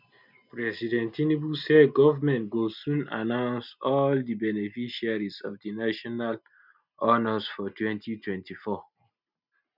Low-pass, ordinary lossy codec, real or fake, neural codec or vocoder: 5.4 kHz; none; real; none